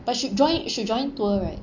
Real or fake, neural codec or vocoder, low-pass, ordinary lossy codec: real; none; 7.2 kHz; none